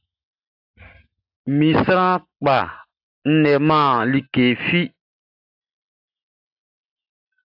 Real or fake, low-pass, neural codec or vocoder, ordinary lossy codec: real; 5.4 kHz; none; AAC, 48 kbps